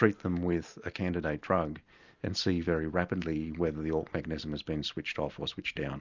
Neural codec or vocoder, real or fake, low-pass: none; real; 7.2 kHz